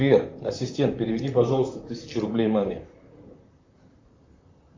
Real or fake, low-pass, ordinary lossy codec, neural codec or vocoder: fake; 7.2 kHz; MP3, 48 kbps; vocoder, 44.1 kHz, 128 mel bands, Pupu-Vocoder